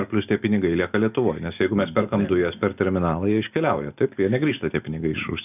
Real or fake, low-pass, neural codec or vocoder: real; 3.6 kHz; none